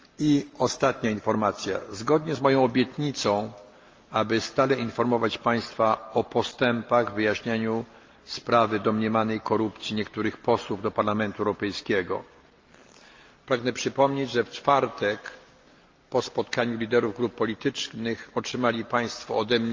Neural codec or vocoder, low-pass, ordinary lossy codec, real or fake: none; 7.2 kHz; Opus, 24 kbps; real